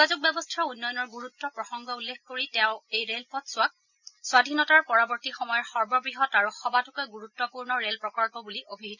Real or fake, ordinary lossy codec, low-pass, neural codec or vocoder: real; none; 7.2 kHz; none